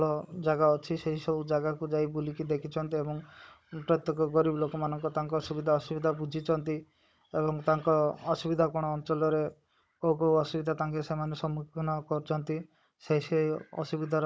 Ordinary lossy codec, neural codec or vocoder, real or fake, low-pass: none; codec, 16 kHz, 16 kbps, FunCodec, trained on Chinese and English, 50 frames a second; fake; none